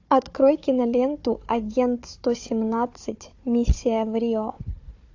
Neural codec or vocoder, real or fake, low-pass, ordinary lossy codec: codec, 16 kHz, 4 kbps, FunCodec, trained on Chinese and English, 50 frames a second; fake; 7.2 kHz; AAC, 48 kbps